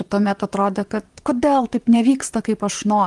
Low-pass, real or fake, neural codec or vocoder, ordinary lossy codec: 10.8 kHz; fake; vocoder, 44.1 kHz, 128 mel bands, Pupu-Vocoder; Opus, 24 kbps